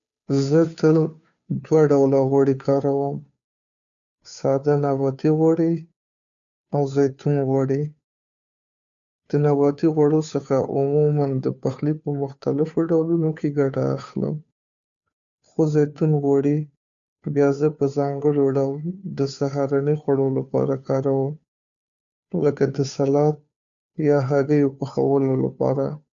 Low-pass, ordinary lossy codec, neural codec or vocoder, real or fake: 7.2 kHz; none; codec, 16 kHz, 2 kbps, FunCodec, trained on Chinese and English, 25 frames a second; fake